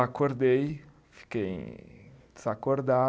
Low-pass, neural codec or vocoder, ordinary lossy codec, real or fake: none; none; none; real